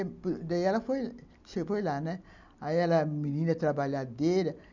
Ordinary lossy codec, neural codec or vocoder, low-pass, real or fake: none; none; 7.2 kHz; real